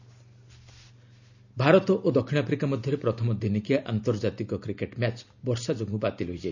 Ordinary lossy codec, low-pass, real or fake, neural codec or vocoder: none; 7.2 kHz; real; none